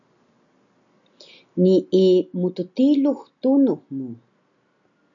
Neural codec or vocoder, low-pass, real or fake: none; 7.2 kHz; real